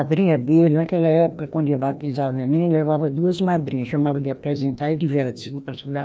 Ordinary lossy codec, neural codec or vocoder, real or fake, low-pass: none; codec, 16 kHz, 1 kbps, FreqCodec, larger model; fake; none